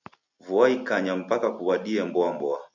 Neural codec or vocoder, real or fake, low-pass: none; real; 7.2 kHz